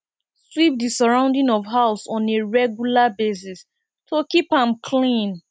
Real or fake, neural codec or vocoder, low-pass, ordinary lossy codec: real; none; none; none